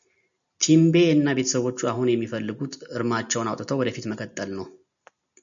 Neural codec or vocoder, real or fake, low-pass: none; real; 7.2 kHz